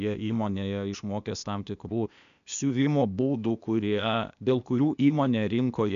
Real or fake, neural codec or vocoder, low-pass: fake; codec, 16 kHz, 0.8 kbps, ZipCodec; 7.2 kHz